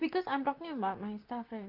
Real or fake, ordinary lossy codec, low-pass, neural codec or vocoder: fake; Opus, 32 kbps; 5.4 kHz; vocoder, 44.1 kHz, 128 mel bands, Pupu-Vocoder